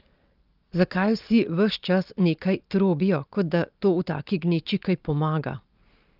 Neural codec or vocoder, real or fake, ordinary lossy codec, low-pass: none; real; Opus, 24 kbps; 5.4 kHz